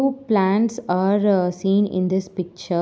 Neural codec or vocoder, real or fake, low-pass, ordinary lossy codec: none; real; none; none